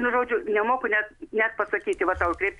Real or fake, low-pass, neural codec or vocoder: real; 10.8 kHz; none